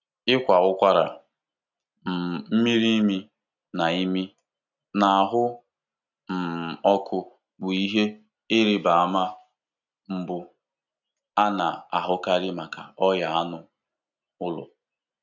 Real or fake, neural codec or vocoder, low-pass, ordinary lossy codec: real; none; 7.2 kHz; none